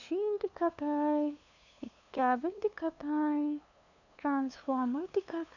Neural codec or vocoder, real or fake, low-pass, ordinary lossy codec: codec, 16 kHz, 2 kbps, FunCodec, trained on LibriTTS, 25 frames a second; fake; 7.2 kHz; none